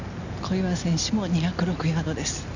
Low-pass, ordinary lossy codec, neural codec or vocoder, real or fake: 7.2 kHz; none; none; real